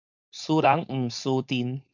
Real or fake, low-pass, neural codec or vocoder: fake; 7.2 kHz; codec, 16 kHz, 4.8 kbps, FACodec